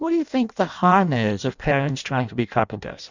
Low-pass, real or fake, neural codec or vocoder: 7.2 kHz; fake; codec, 16 kHz in and 24 kHz out, 0.6 kbps, FireRedTTS-2 codec